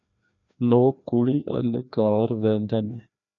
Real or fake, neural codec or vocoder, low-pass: fake; codec, 16 kHz, 1 kbps, FreqCodec, larger model; 7.2 kHz